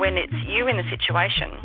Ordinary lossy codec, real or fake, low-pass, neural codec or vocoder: Opus, 24 kbps; real; 5.4 kHz; none